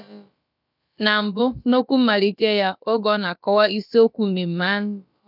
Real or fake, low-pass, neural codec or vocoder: fake; 5.4 kHz; codec, 16 kHz, about 1 kbps, DyCAST, with the encoder's durations